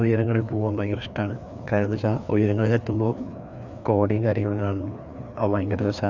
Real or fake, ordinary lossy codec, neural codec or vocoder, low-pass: fake; none; codec, 16 kHz, 2 kbps, FreqCodec, larger model; 7.2 kHz